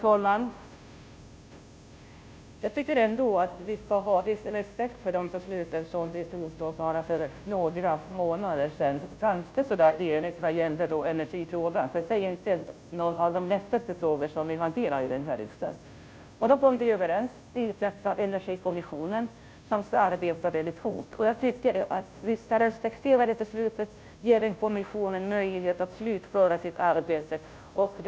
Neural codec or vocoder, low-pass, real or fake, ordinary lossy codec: codec, 16 kHz, 0.5 kbps, FunCodec, trained on Chinese and English, 25 frames a second; none; fake; none